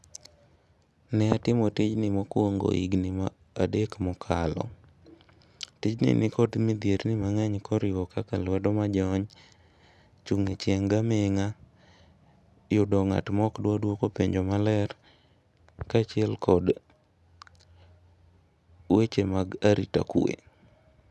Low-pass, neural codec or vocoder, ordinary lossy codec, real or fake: none; none; none; real